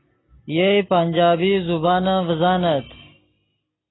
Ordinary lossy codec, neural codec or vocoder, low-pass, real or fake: AAC, 16 kbps; none; 7.2 kHz; real